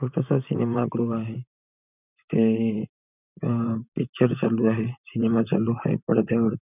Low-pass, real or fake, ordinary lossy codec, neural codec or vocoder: 3.6 kHz; fake; none; vocoder, 44.1 kHz, 128 mel bands, Pupu-Vocoder